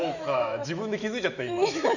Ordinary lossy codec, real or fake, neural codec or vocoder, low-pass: none; fake; autoencoder, 48 kHz, 128 numbers a frame, DAC-VAE, trained on Japanese speech; 7.2 kHz